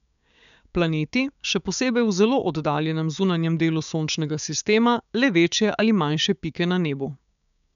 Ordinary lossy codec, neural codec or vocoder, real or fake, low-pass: none; codec, 16 kHz, 6 kbps, DAC; fake; 7.2 kHz